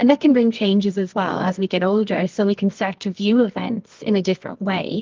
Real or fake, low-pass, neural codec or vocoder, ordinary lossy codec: fake; 7.2 kHz; codec, 24 kHz, 0.9 kbps, WavTokenizer, medium music audio release; Opus, 24 kbps